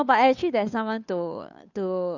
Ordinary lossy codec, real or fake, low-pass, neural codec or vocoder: none; fake; 7.2 kHz; codec, 16 kHz, 2 kbps, FunCodec, trained on Chinese and English, 25 frames a second